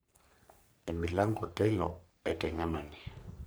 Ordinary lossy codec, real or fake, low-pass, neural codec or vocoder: none; fake; none; codec, 44.1 kHz, 3.4 kbps, Pupu-Codec